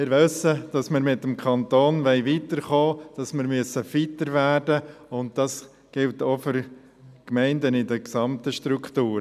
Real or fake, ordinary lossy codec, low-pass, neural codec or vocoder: real; none; 14.4 kHz; none